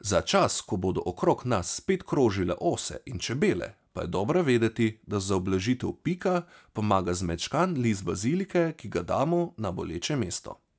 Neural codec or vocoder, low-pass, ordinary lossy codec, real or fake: none; none; none; real